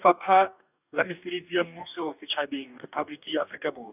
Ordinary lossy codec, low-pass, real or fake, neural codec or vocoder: none; 3.6 kHz; fake; codec, 44.1 kHz, 2.6 kbps, DAC